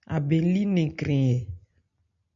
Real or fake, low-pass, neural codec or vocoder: real; 7.2 kHz; none